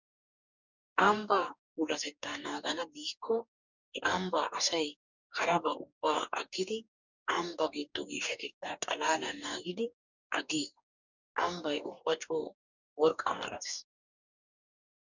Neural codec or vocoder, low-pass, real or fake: codec, 44.1 kHz, 2.6 kbps, DAC; 7.2 kHz; fake